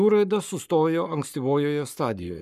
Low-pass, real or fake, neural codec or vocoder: 14.4 kHz; fake; codec, 44.1 kHz, 7.8 kbps, Pupu-Codec